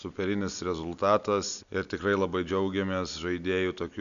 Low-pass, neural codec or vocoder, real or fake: 7.2 kHz; none; real